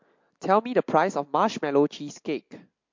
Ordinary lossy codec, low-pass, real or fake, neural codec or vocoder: MP3, 48 kbps; 7.2 kHz; real; none